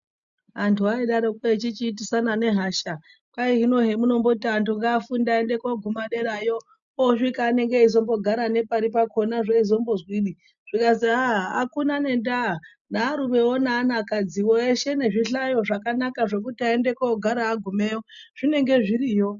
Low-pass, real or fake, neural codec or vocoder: 7.2 kHz; real; none